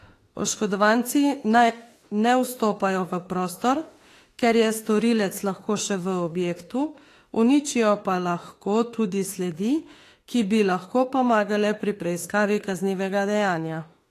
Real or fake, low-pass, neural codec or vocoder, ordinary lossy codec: fake; 14.4 kHz; autoencoder, 48 kHz, 32 numbers a frame, DAC-VAE, trained on Japanese speech; AAC, 48 kbps